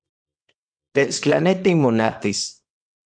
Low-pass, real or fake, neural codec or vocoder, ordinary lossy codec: 9.9 kHz; fake; codec, 24 kHz, 0.9 kbps, WavTokenizer, small release; MP3, 64 kbps